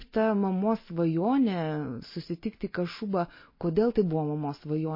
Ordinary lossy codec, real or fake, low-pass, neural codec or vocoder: MP3, 24 kbps; real; 5.4 kHz; none